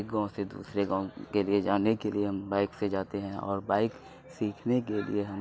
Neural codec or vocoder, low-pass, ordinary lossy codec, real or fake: none; none; none; real